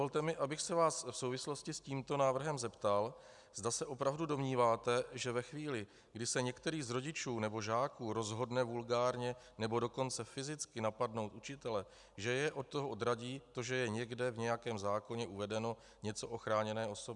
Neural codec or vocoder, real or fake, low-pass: none; real; 10.8 kHz